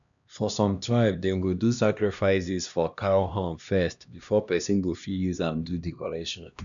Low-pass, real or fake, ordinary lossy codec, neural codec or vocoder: 7.2 kHz; fake; none; codec, 16 kHz, 1 kbps, X-Codec, HuBERT features, trained on LibriSpeech